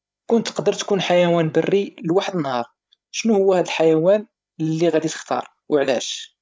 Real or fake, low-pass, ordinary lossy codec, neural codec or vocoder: fake; none; none; codec, 16 kHz, 8 kbps, FreqCodec, larger model